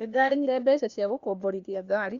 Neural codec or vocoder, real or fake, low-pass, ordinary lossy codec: codec, 16 kHz, 0.8 kbps, ZipCodec; fake; 7.2 kHz; Opus, 64 kbps